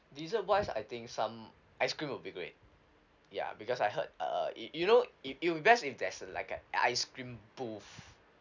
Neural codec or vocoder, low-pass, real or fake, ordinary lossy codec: none; 7.2 kHz; real; none